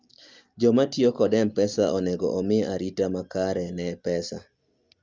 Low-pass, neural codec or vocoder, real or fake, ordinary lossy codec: 7.2 kHz; none; real; Opus, 32 kbps